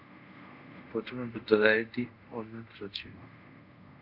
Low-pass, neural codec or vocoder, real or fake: 5.4 kHz; codec, 24 kHz, 0.5 kbps, DualCodec; fake